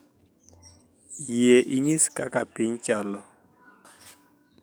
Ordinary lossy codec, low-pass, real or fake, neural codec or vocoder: none; none; fake; codec, 44.1 kHz, 7.8 kbps, DAC